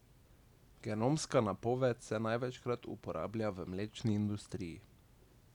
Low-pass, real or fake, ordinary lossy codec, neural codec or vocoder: 19.8 kHz; real; none; none